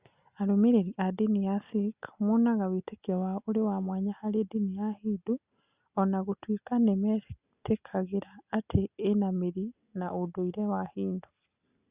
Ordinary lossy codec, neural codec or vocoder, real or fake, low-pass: Opus, 64 kbps; none; real; 3.6 kHz